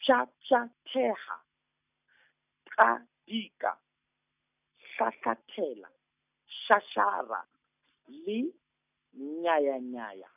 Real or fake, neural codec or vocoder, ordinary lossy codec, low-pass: real; none; none; 3.6 kHz